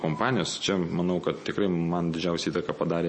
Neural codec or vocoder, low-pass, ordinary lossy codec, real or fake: none; 10.8 kHz; MP3, 32 kbps; real